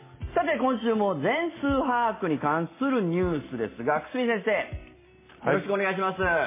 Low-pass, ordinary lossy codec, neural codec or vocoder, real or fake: 3.6 kHz; MP3, 16 kbps; none; real